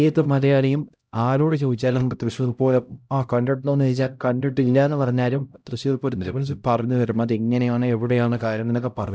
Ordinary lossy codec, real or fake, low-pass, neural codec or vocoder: none; fake; none; codec, 16 kHz, 0.5 kbps, X-Codec, HuBERT features, trained on LibriSpeech